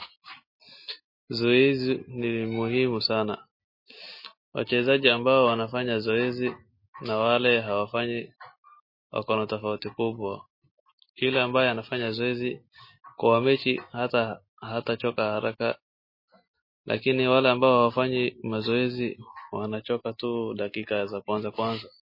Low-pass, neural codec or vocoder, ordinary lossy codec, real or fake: 5.4 kHz; none; MP3, 24 kbps; real